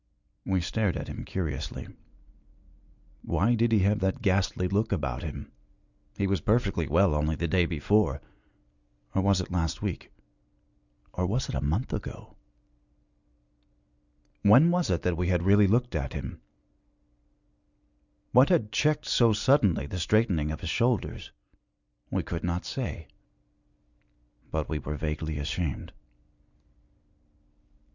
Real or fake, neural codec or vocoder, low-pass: real; none; 7.2 kHz